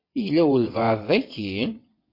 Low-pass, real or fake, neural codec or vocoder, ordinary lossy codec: 5.4 kHz; fake; vocoder, 22.05 kHz, 80 mel bands, WaveNeXt; MP3, 32 kbps